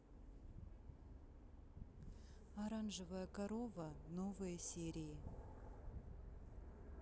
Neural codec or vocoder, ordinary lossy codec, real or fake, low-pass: none; none; real; none